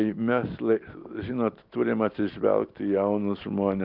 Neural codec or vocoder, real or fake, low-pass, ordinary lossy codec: none; real; 5.4 kHz; Opus, 32 kbps